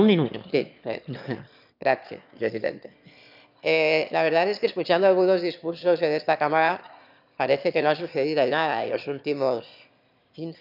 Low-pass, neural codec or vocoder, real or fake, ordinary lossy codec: 5.4 kHz; autoencoder, 22.05 kHz, a latent of 192 numbers a frame, VITS, trained on one speaker; fake; none